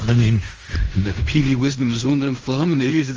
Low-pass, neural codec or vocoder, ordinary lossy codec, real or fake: 7.2 kHz; codec, 16 kHz in and 24 kHz out, 0.4 kbps, LongCat-Audio-Codec, fine tuned four codebook decoder; Opus, 24 kbps; fake